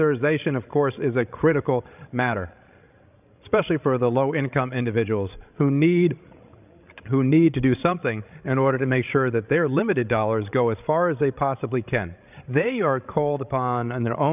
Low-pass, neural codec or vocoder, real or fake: 3.6 kHz; codec, 16 kHz, 16 kbps, FreqCodec, larger model; fake